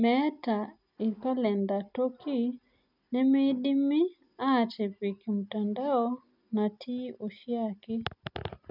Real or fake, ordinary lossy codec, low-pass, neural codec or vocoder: real; none; 5.4 kHz; none